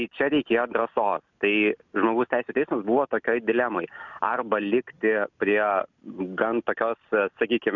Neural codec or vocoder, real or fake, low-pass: none; real; 7.2 kHz